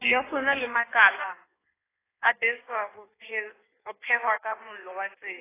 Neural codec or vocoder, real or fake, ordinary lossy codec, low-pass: codec, 16 kHz in and 24 kHz out, 1.1 kbps, FireRedTTS-2 codec; fake; AAC, 16 kbps; 3.6 kHz